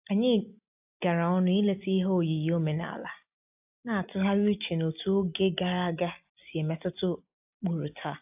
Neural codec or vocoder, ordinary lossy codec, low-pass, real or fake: none; AAC, 32 kbps; 3.6 kHz; real